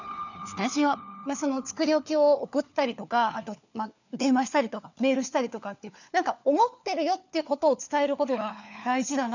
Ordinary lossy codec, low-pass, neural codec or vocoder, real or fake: none; 7.2 kHz; codec, 16 kHz, 4 kbps, FunCodec, trained on LibriTTS, 50 frames a second; fake